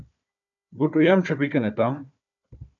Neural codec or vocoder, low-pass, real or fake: codec, 16 kHz, 4 kbps, FunCodec, trained on Chinese and English, 50 frames a second; 7.2 kHz; fake